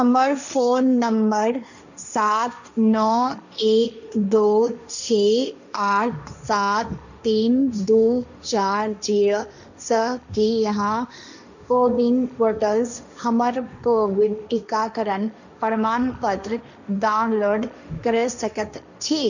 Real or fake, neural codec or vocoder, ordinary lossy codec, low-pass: fake; codec, 16 kHz, 1.1 kbps, Voila-Tokenizer; none; 7.2 kHz